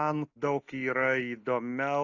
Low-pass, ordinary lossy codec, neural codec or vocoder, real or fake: 7.2 kHz; AAC, 48 kbps; none; real